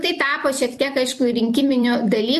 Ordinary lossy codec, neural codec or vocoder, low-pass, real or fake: MP3, 64 kbps; vocoder, 44.1 kHz, 128 mel bands every 256 samples, BigVGAN v2; 14.4 kHz; fake